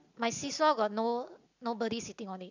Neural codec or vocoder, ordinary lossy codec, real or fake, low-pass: none; none; real; 7.2 kHz